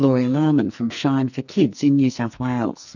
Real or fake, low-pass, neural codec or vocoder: fake; 7.2 kHz; codec, 44.1 kHz, 2.6 kbps, SNAC